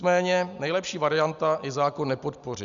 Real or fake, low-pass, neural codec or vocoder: real; 7.2 kHz; none